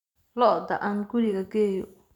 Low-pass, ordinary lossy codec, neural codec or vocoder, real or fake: 19.8 kHz; none; vocoder, 44.1 kHz, 128 mel bands every 512 samples, BigVGAN v2; fake